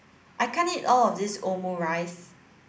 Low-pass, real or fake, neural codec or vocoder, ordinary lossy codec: none; real; none; none